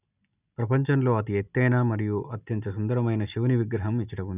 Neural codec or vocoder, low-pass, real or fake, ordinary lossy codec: none; 3.6 kHz; real; none